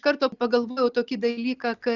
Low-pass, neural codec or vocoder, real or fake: 7.2 kHz; none; real